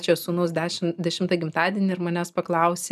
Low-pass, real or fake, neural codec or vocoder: 14.4 kHz; real; none